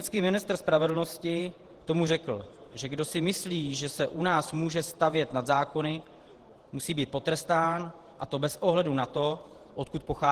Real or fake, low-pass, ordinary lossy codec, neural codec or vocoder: fake; 14.4 kHz; Opus, 16 kbps; vocoder, 48 kHz, 128 mel bands, Vocos